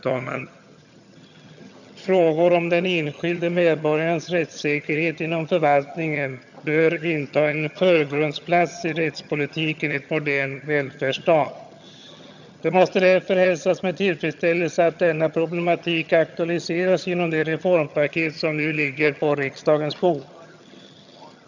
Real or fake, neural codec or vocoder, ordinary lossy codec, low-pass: fake; vocoder, 22.05 kHz, 80 mel bands, HiFi-GAN; none; 7.2 kHz